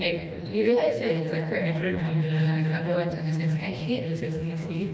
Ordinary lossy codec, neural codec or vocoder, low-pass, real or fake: none; codec, 16 kHz, 1 kbps, FreqCodec, smaller model; none; fake